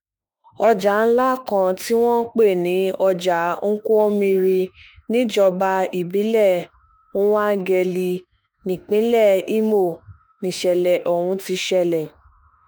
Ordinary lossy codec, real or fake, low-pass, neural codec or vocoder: none; fake; none; autoencoder, 48 kHz, 32 numbers a frame, DAC-VAE, trained on Japanese speech